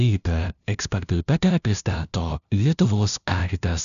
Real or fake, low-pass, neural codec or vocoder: fake; 7.2 kHz; codec, 16 kHz, 0.5 kbps, FunCodec, trained on LibriTTS, 25 frames a second